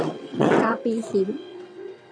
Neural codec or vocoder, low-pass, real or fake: vocoder, 22.05 kHz, 80 mel bands, WaveNeXt; 9.9 kHz; fake